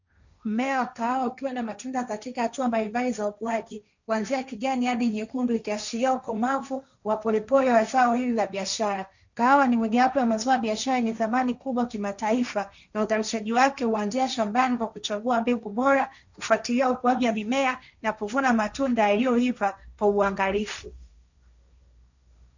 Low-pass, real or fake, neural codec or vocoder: 7.2 kHz; fake; codec, 16 kHz, 1.1 kbps, Voila-Tokenizer